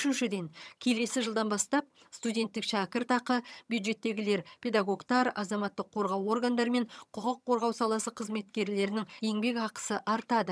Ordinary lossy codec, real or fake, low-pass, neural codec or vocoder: none; fake; none; vocoder, 22.05 kHz, 80 mel bands, HiFi-GAN